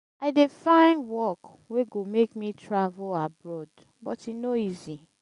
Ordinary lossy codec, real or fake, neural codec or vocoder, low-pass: none; real; none; 10.8 kHz